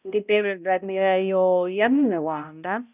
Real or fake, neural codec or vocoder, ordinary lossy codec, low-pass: fake; codec, 16 kHz, 0.5 kbps, X-Codec, HuBERT features, trained on balanced general audio; none; 3.6 kHz